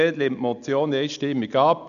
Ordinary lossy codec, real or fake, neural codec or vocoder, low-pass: none; real; none; 7.2 kHz